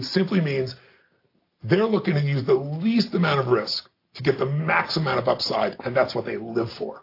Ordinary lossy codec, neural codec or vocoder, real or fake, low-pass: AAC, 32 kbps; none; real; 5.4 kHz